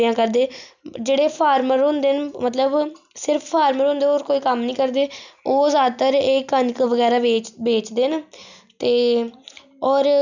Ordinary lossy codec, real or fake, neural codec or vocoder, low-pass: none; real; none; 7.2 kHz